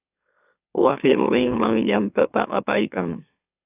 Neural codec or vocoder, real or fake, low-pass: autoencoder, 44.1 kHz, a latent of 192 numbers a frame, MeloTTS; fake; 3.6 kHz